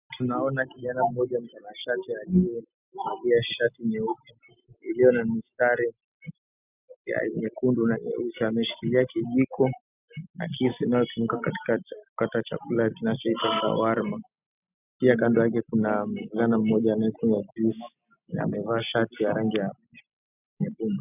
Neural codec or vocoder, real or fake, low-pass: none; real; 3.6 kHz